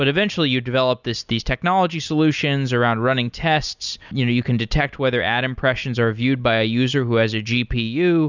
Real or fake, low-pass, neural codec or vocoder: real; 7.2 kHz; none